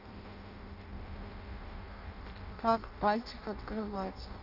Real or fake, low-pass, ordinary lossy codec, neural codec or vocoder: fake; 5.4 kHz; none; codec, 16 kHz in and 24 kHz out, 0.6 kbps, FireRedTTS-2 codec